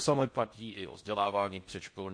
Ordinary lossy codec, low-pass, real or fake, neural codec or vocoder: MP3, 48 kbps; 9.9 kHz; fake; codec, 16 kHz in and 24 kHz out, 0.6 kbps, FocalCodec, streaming, 4096 codes